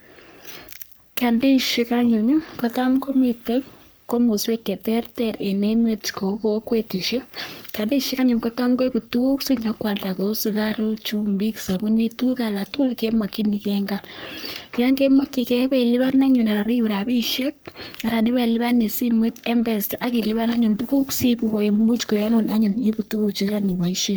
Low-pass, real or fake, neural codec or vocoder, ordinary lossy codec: none; fake; codec, 44.1 kHz, 3.4 kbps, Pupu-Codec; none